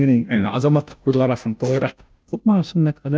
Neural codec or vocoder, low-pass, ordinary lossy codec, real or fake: codec, 16 kHz, 0.5 kbps, FunCodec, trained on Chinese and English, 25 frames a second; none; none; fake